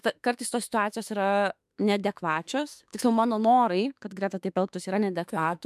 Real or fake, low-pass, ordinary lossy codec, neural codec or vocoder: fake; 14.4 kHz; MP3, 96 kbps; autoencoder, 48 kHz, 32 numbers a frame, DAC-VAE, trained on Japanese speech